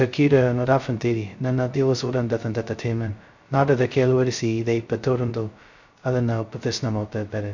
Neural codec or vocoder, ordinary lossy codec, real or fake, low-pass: codec, 16 kHz, 0.2 kbps, FocalCodec; none; fake; 7.2 kHz